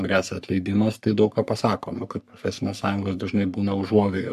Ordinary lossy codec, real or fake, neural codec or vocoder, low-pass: AAC, 96 kbps; fake; codec, 44.1 kHz, 3.4 kbps, Pupu-Codec; 14.4 kHz